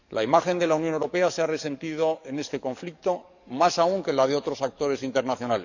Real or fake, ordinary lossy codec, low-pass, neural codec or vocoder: fake; none; 7.2 kHz; codec, 44.1 kHz, 7.8 kbps, DAC